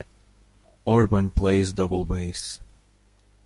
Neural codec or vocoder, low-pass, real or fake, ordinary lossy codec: codec, 32 kHz, 1.9 kbps, SNAC; 14.4 kHz; fake; MP3, 48 kbps